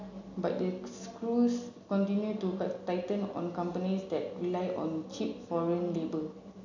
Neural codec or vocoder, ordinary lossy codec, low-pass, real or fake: none; none; 7.2 kHz; real